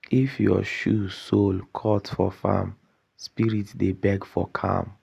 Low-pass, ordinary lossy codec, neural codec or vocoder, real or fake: 14.4 kHz; Opus, 64 kbps; none; real